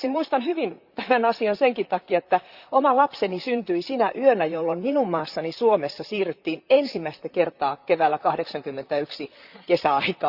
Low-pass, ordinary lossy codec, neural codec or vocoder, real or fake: 5.4 kHz; Opus, 64 kbps; vocoder, 44.1 kHz, 128 mel bands, Pupu-Vocoder; fake